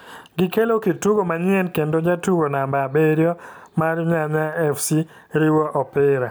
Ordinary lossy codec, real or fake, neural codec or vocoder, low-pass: none; real; none; none